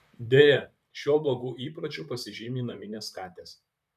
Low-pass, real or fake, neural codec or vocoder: 14.4 kHz; fake; vocoder, 44.1 kHz, 128 mel bands, Pupu-Vocoder